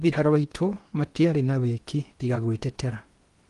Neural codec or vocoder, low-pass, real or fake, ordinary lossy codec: codec, 16 kHz in and 24 kHz out, 0.8 kbps, FocalCodec, streaming, 65536 codes; 10.8 kHz; fake; Opus, 24 kbps